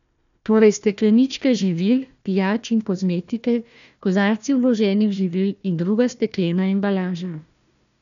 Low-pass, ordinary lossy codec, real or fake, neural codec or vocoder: 7.2 kHz; none; fake; codec, 16 kHz, 1 kbps, FunCodec, trained on Chinese and English, 50 frames a second